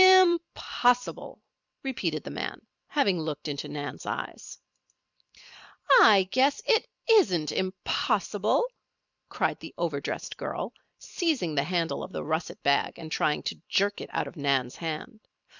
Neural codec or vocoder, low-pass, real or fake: vocoder, 44.1 kHz, 128 mel bands every 256 samples, BigVGAN v2; 7.2 kHz; fake